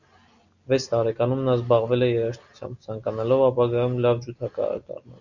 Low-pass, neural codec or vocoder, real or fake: 7.2 kHz; none; real